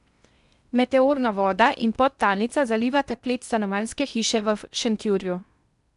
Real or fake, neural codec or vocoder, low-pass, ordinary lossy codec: fake; codec, 16 kHz in and 24 kHz out, 0.8 kbps, FocalCodec, streaming, 65536 codes; 10.8 kHz; none